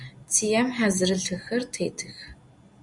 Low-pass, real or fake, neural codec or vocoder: 10.8 kHz; real; none